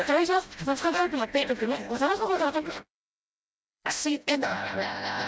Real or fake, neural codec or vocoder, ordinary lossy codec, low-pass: fake; codec, 16 kHz, 0.5 kbps, FreqCodec, smaller model; none; none